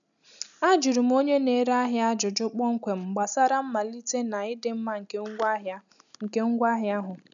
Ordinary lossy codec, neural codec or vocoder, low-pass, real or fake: none; none; 7.2 kHz; real